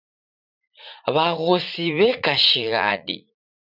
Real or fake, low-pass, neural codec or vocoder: fake; 5.4 kHz; vocoder, 44.1 kHz, 80 mel bands, Vocos